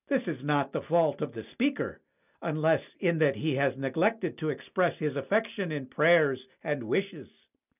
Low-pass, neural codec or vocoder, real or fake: 3.6 kHz; none; real